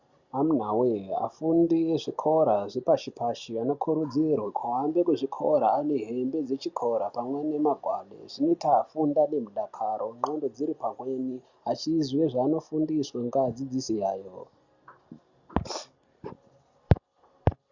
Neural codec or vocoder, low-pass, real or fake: none; 7.2 kHz; real